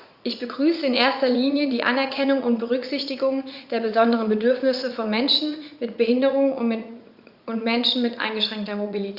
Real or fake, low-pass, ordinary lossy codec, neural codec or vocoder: fake; 5.4 kHz; Opus, 64 kbps; vocoder, 44.1 kHz, 80 mel bands, Vocos